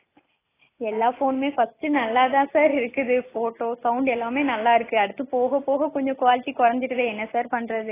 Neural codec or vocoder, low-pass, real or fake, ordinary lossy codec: none; 3.6 kHz; real; AAC, 16 kbps